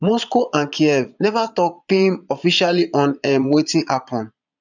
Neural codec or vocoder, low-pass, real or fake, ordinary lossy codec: vocoder, 22.05 kHz, 80 mel bands, Vocos; 7.2 kHz; fake; none